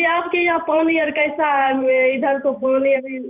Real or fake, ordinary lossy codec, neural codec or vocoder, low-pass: fake; none; vocoder, 44.1 kHz, 128 mel bands every 512 samples, BigVGAN v2; 3.6 kHz